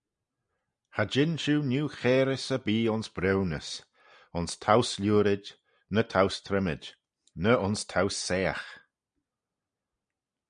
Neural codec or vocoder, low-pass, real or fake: none; 9.9 kHz; real